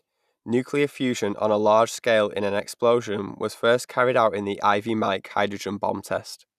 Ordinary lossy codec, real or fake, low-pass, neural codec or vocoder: none; real; 14.4 kHz; none